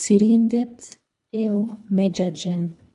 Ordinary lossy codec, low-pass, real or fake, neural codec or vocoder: none; 10.8 kHz; fake; codec, 24 kHz, 1.5 kbps, HILCodec